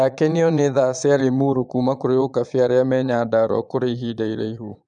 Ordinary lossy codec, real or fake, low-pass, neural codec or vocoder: none; fake; 9.9 kHz; vocoder, 22.05 kHz, 80 mel bands, Vocos